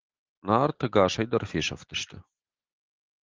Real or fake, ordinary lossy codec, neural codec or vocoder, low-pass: real; Opus, 16 kbps; none; 7.2 kHz